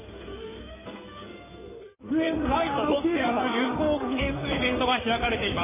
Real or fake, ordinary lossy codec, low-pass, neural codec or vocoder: fake; MP3, 16 kbps; 3.6 kHz; codec, 16 kHz in and 24 kHz out, 2.2 kbps, FireRedTTS-2 codec